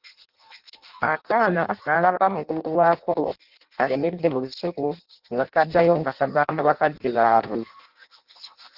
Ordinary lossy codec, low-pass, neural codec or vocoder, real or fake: Opus, 32 kbps; 5.4 kHz; codec, 16 kHz in and 24 kHz out, 0.6 kbps, FireRedTTS-2 codec; fake